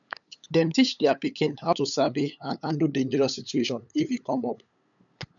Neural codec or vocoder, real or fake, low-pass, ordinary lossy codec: codec, 16 kHz, 8 kbps, FunCodec, trained on LibriTTS, 25 frames a second; fake; 7.2 kHz; none